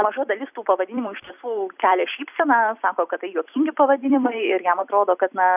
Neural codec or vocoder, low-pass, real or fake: vocoder, 44.1 kHz, 128 mel bands every 256 samples, BigVGAN v2; 3.6 kHz; fake